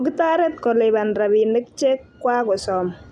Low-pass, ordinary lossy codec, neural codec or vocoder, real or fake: none; none; none; real